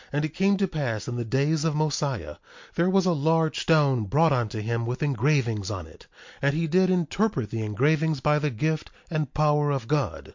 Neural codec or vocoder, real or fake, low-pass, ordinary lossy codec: none; real; 7.2 kHz; MP3, 48 kbps